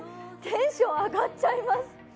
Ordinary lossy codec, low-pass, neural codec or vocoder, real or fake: none; none; none; real